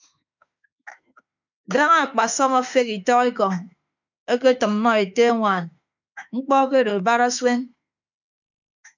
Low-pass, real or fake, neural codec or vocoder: 7.2 kHz; fake; autoencoder, 48 kHz, 32 numbers a frame, DAC-VAE, trained on Japanese speech